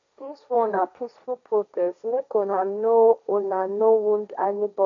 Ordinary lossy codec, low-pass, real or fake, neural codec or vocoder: none; 7.2 kHz; fake; codec, 16 kHz, 1.1 kbps, Voila-Tokenizer